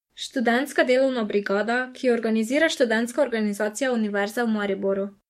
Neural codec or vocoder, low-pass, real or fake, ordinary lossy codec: codec, 44.1 kHz, 7.8 kbps, DAC; 19.8 kHz; fake; MP3, 64 kbps